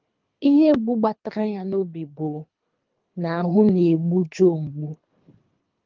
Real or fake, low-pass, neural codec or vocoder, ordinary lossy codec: fake; 7.2 kHz; codec, 24 kHz, 3 kbps, HILCodec; Opus, 32 kbps